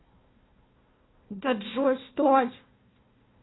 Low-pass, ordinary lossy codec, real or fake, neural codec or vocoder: 7.2 kHz; AAC, 16 kbps; fake; codec, 16 kHz, 1 kbps, FunCodec, trained on Chinese and English, 50 frames a second